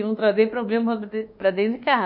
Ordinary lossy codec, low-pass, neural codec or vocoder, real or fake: none; 5.4 kHz; codec, 24 kHz, 1.2 kbps, DualCodec; fake